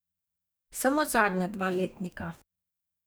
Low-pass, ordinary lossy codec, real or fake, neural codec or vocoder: none; none; fake; codec, 44.1 kHz, 2.6 kbps, DAC